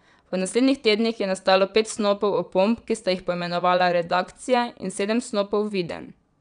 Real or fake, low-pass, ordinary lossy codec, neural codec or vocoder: fake; 9.9 kHz; none; vocoder, 22.05 kHz, 80 mel bands, Vocos